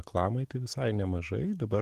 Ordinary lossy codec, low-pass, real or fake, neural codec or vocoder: Opus, 16 kbps; 14.4 kHz; fake; autoencoder, 48 kHz, 128 numbers a frame, DAC-VAE, trained on Japanese speech